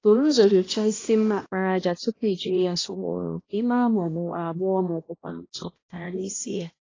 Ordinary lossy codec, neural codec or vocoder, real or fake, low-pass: AAC, 32 kbps; codec, 16 kHz, 1 kbps, X-Codec, HuBERT features, trained on balanced general audio; fake; 7.2 kHz